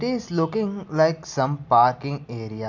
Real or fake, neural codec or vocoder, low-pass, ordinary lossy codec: real; none; 7.2 kHz; none